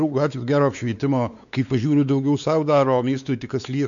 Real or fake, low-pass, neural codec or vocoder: fake; 7.2 kHz; codec, 16 kHz, 4 kbps, X-Codec, WavLM features, trained on Multilingual LibriSpeech